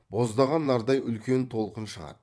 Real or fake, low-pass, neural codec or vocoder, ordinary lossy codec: fake; none; vocoder, 22.05 kHz, 80 mel bands, WaveNeXt; none